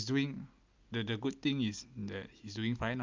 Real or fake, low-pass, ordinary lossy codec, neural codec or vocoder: real; 7.2 kHz; Opus, 24 kbps; none